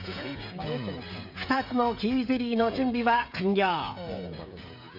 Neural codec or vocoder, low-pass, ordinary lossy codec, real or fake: codec, 16 kHz, 16 kbps, FreqCodec, smaller model; 5.4 kHz; AAC, 48 kbps; fake